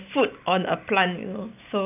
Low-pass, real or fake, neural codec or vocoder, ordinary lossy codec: 3.6 kHz; real; none; none